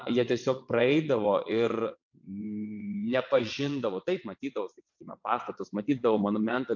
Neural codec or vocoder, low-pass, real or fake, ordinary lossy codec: vocoder, 22.05 kHz, 80 mel bands, WaveNeXt; 7.2 kHz; fake; MP3, 48 kbps